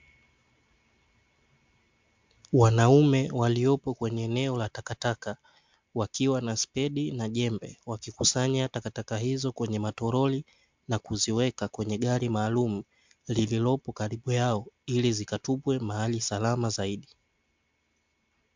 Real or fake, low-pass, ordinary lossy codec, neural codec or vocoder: real; 7.2 kHz; MP3, 64 kbps; none